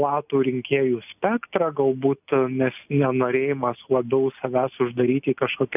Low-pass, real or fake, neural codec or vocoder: 3.6 kHz; real; none